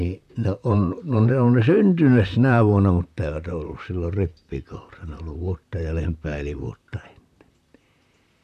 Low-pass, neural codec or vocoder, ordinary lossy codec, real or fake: 14.4 kHz; vocoder, 44.1 kHz, 128 mel bands, Pupu-Vocoder; none; fake